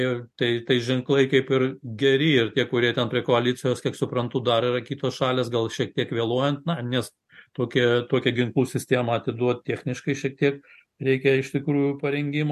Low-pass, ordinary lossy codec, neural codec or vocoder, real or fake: 14.4 kHz; MP3, 64 kbps; none; real